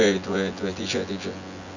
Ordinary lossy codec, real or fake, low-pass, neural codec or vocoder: none; fake; 7.2 kHz; vocoder, 24 kHz, 100 mel bands, Vocos